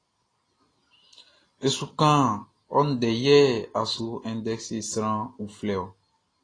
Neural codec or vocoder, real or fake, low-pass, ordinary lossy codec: none; real; 9.9 kHz; AAC, 32 kbps